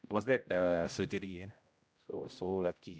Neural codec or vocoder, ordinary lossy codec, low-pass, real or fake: codec, 16 kHz, 0.5 kbps, X-Codec, HuBERT features, trained on general audio; none; none; fake